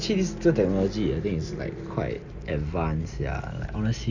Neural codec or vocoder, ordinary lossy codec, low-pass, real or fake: none; none; 7.2 kHz; real